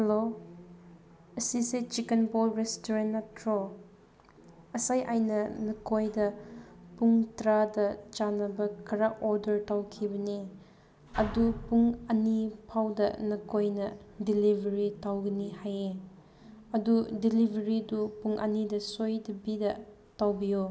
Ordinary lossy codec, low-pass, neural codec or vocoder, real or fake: none; none; none; real